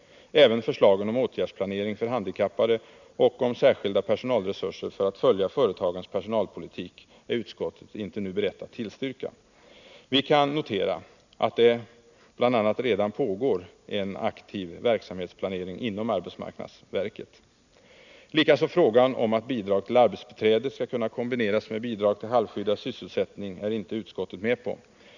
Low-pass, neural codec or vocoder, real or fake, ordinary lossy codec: 7.2 kHz; none; real; none